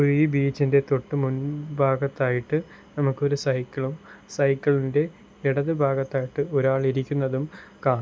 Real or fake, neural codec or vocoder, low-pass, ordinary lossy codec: real; none; none; none